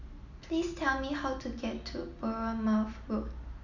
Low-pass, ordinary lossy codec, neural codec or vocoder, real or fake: 7.2 kHz; none; none; real